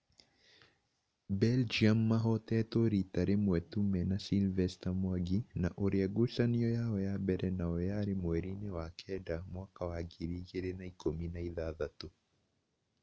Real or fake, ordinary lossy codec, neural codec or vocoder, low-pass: real; none; none; none